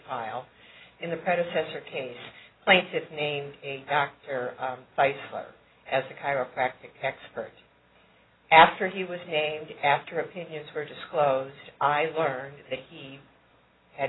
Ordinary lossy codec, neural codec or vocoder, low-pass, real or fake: AAC, 16 kbps; none; 7.2 kHz; real